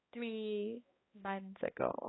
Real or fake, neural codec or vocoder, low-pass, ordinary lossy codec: fake; codec, 16 kHz, 1 kbps, X-Codec, HuBERT features, trained on balanced general audio; 7.2 kHz; AAC, 16 kbps